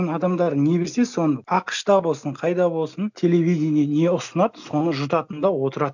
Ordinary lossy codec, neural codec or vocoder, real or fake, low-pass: none; none; real; 7.2 kHz